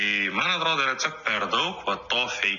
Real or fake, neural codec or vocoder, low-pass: real; none; 7.2 kHz